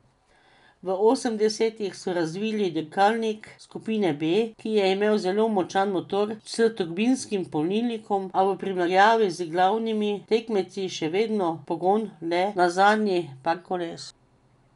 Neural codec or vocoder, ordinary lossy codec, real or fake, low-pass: none; none; real; 10.8 kHz